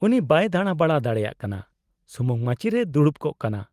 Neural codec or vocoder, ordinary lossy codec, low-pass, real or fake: vocoder, 44.1 kHz, 128 mel bands every 256 samples, BigVGAN v2; Opus, 32 kbps; 14.4 kHz; fake